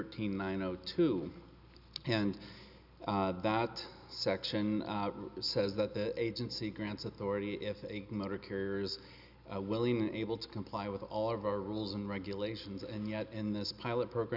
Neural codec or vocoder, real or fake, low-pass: none; real; 5.4 kHz